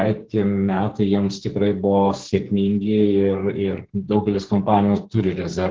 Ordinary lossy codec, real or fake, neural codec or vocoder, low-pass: Opus, 16 kbps; fake; codec, 44.1 kHz, 2.6 kbps, SNAC; 7.2 kHz